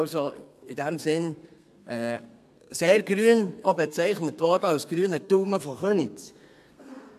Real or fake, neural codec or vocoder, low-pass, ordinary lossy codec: fake; codec, 32 kHz, 1.9 kbps, SNAC; 14.4 kHz; MP3, 96 kbps